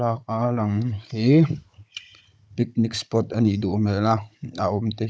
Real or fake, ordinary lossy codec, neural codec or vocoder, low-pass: fake; none; codec, 16 kHz, 16 kbps, FunCodec, trained on Chinese and English, 50 frames a second; none